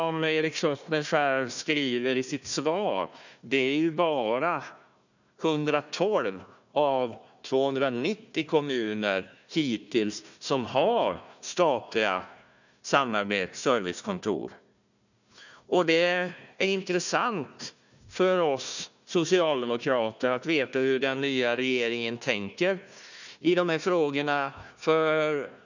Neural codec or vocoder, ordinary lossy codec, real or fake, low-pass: codec, 16 kHz, 1 kbps, FunCodec, trained on Chinese and English, 50 frames a second; none; fake; 7.2 kHz